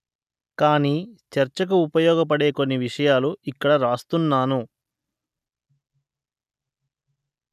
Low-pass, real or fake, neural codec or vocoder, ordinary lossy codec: 14.4 kHz; real; none; none